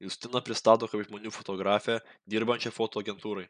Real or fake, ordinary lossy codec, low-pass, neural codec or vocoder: real; MP3, 96 kbps; 9.9 kHz; none